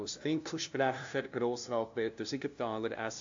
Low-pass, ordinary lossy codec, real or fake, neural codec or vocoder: 7.2 kHz; AAC, 64 kbps; fake; codec, 16 kHz, 0.5 kbps, FunCodec, trained on LibriTTS, 25 frames a second